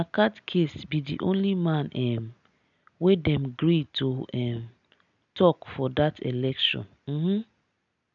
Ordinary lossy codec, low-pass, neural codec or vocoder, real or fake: none; 7.2 kHz; none; real